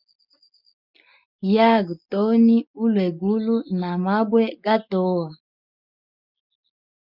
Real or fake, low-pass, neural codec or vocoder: real; 5.4 kHz; none